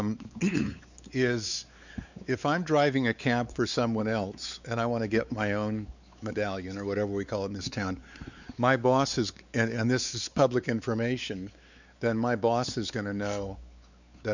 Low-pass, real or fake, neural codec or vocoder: 7.2 kHz; fake; codec, 16 kHz, 4 kbps, X-Codec, WavLM features, trained on Multilingual LibriSpeech